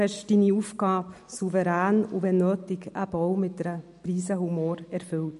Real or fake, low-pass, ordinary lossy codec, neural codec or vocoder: real; 14.4 kHz; MP3, 48 kbps; none